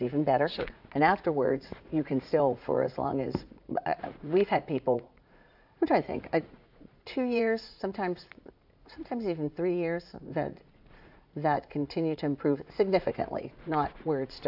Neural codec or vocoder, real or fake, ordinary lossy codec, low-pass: vocoder, 44.1 kHz, 80 mel bands, Vocos; fake; AAC, 48 kbps; 5.4 kHz